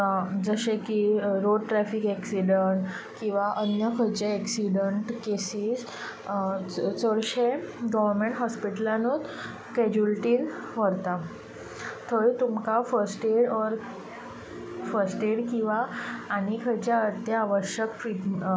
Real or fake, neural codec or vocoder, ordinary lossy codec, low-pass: real; none; none; none